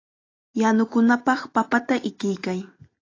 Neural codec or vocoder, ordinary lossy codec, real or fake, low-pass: none; AAC, 32 kbps; real; 7.2 kHz